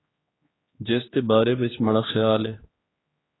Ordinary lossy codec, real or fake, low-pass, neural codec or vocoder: AAC, 16 kbps; fake; 7.2 kHz; codec, 16 kHz, 2 kbps, X-Codec, HuBERT features, trained on general audio